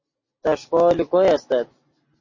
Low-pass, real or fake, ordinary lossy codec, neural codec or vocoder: 7.2 kHz; real; MP3, 32 kbps; none